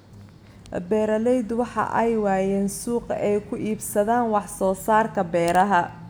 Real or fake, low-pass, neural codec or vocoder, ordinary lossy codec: real; none; none; none